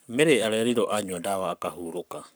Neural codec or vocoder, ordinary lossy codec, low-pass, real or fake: codec, 44.1 kHz, 7.8 kbps, Pupu-Codec; none; none; fake